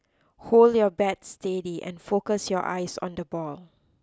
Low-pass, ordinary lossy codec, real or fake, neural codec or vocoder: none; none; real; none